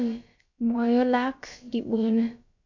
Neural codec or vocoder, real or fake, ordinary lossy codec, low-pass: codec, 16 kHz, about 1 kbps, DyCAST, with the encoder's durations; fake; MP3, 48 kbps; 7.2 kHz